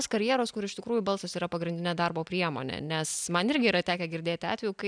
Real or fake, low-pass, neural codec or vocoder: real; 9.9 kHz; none